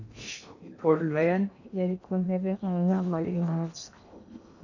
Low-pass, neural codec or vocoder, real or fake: 7.2 kHz; codec, 16 kHz in and 24 kHz out, 0.8 kbps, FocalCodec, streaming, 65536 codes; fake